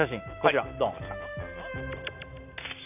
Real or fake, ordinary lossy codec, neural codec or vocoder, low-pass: real; none; none; 3.6 kHz